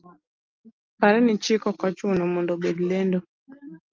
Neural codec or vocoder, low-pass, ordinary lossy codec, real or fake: none; 7.2 kHz; Opus, 32 kbps; real